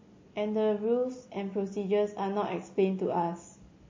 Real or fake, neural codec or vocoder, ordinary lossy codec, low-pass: real; none; MP3, 32 kbps; 7.2 kHz